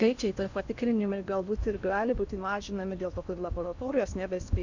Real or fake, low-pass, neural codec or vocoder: fake; 7.2 kHz; codec, 16 kHz in and 24 kHz out, 0.8 kbps, FocalCodec, streaming, 65536 codes